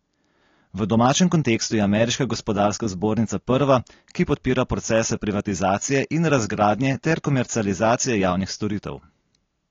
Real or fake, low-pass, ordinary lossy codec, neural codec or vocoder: real; 7.2 kHz; AAC, 32 kbps; none